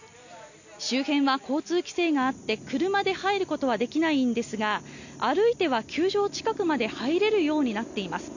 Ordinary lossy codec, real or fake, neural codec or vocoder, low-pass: none; real; none; 7.2 kHz